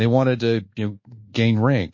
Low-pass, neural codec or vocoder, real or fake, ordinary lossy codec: 7.2 kHz; codec, 24 kHz, 1.2 kbps, DualCodec; fake; MP3, 32 kbps